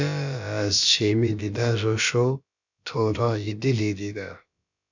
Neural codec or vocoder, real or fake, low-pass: codec, 16 kHz, about 1 kbps, DyCAST, with the encoder's durations; fake; 7.2 kHz